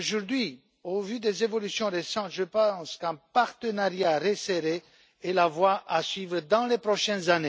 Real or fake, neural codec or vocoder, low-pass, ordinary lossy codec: real; none; none; none